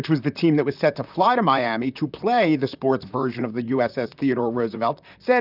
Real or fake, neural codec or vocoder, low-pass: fake; vocoder, 44.1 kHz, 128 mel bands, Pupu-Vocoder; 5.4 kHz